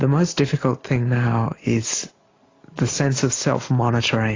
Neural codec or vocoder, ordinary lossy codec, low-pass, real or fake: none; AAC, 32 kbps; 7.2 kHz; real